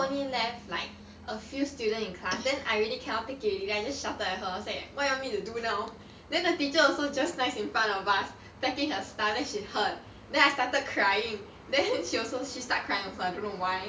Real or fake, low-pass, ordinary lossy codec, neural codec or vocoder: real; none; none; none